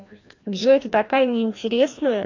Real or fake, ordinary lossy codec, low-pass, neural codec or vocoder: fake; AAC, 48 kbps; 7.2 kHz; codec, 16 kHz, 1 kbps, FreqCodec, larger model